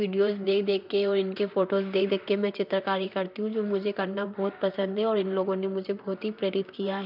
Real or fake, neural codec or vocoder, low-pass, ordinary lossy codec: fake; vocoder, 44.1 kHz, 128 mel bands, Pupu-Vocoder; 5.4 kHz; none